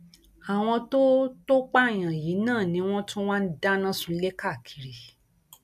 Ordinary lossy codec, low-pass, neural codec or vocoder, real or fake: none; 14.4 kHz; none; real